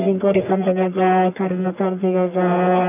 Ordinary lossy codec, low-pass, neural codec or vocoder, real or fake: none; 3.6 kHz; codec, 44.1 kHz, 1.7 kbps, Pupu-Codec; fake